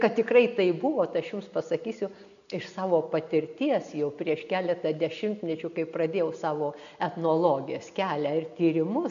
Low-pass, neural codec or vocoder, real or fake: 7.2 kHz; none; real